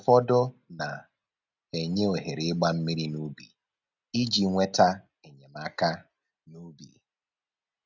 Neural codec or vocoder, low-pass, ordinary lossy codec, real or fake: none; 7.2 kHz; none; real